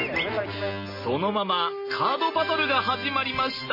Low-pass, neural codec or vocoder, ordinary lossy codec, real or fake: 5.4 kHz; none; MP3, 24 kbps; real